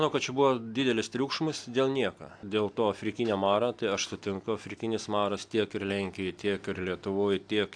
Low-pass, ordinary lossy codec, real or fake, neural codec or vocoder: 9.9 kHz; AAC, 64 kbps; real; none